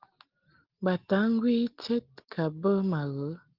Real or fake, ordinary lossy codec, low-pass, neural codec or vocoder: real; Opus, 16 kbps; 5.4 kHz; none